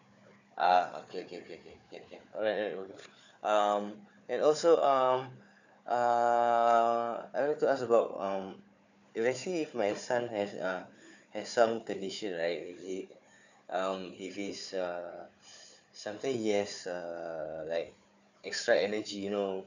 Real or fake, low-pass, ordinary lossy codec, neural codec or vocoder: fake; 7.2 kHz; none; codec, 16 kHz, 4 kbps, FunCodec, trained on Chinese and English, 50 frames a second